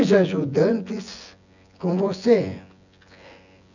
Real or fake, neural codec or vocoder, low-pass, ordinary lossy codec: fake; vocoder, 24 kHz, 100 mel bands, Vocos; 7.2 kHz; none